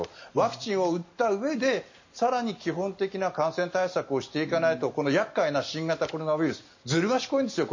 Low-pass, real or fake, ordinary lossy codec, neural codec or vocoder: 7.2 kHz; real; MP3, 32 kbps; none